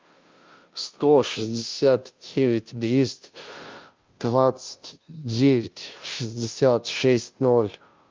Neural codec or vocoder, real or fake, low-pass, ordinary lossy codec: codec, 16 kHz, 0.5 kbps, FunCodec, trained on Chinese and English, 25 frames a second; fake; 7.2 kHz; Opus, 24 kbps